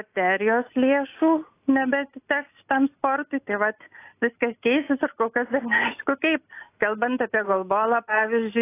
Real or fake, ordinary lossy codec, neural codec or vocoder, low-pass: real; AAC, 24 kbps; none; 3.6 kHz